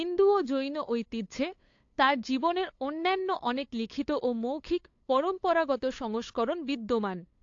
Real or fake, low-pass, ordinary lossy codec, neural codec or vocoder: fake; 7.2 kHz; AAC, 48 kbps; codec, 16 kHz, 8 kbps, FunCodec, trained on LibriTTS, 25 frames a second